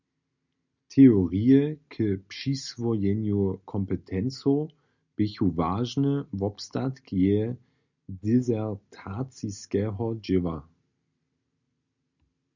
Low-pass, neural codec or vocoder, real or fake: 7.2 kHz; none; real